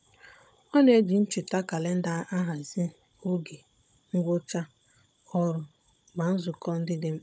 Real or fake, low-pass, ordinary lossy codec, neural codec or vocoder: fake; none; none; codec, 16 kHz, 16 kbps, FunCodec, trained on Chinese and English, 50 frames a second